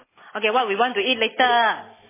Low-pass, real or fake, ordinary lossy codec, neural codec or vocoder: 3.6 kHz; real; MP3, 16 kbps; none